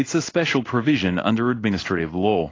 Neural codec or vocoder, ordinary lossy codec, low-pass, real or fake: codec, 16 kHz in and 24 kHz out, 1 kbps, XY-Tokenizer; AAC, 32 kbps; 7.2 kHz; fake